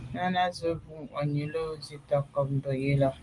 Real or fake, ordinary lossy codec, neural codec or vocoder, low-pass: real; Opus, 32 kbps; none; 10.8 kHz